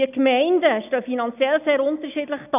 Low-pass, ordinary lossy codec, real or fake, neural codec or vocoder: 3.6 kHz; none; real; none